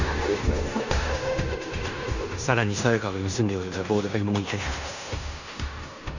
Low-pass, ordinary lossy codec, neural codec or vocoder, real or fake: 7.2 kHz; none; codec, 16 kHz in and 24 kHz out, 0.9 kbps, LongCat-Audio-Codec, fine tuned four codebook decoder; fake